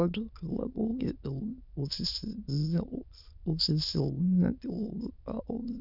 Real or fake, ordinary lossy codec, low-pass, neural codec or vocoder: fake; none; 5.4 kHz; autoencoder, 22.05 kHz, a latent of 192 numbers a frame, VITS, trained on many speakers